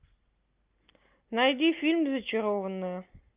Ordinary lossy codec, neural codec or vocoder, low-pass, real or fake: Opus, 32 kbps; none; 3.6 kHz; real